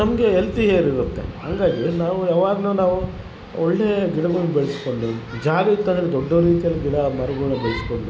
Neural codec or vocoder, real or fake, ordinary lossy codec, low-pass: none; real; none; none